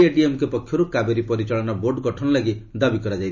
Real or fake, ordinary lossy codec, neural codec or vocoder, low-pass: real; none; none; 7.2 kHz